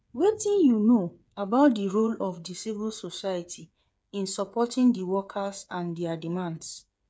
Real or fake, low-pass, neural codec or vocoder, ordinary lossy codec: fake; none; codec, 16 kHz, 8 kbps, FreqCodec, smaller model; none